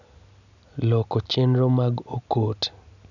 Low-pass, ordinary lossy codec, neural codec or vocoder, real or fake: 7.2 kHz; none; none; real